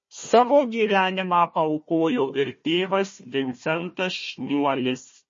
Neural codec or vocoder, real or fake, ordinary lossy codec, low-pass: codec, 16 kHz, 1 kbps, FunCodec, trained on Chinese and English, 50 frames a second; fake; MP3, 32 kbps; 7.2 kHz